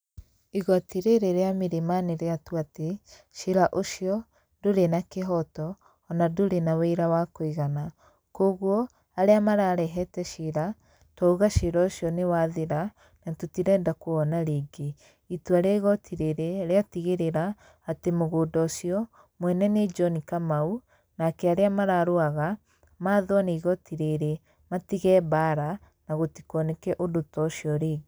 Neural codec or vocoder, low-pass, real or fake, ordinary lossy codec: none; none; real; none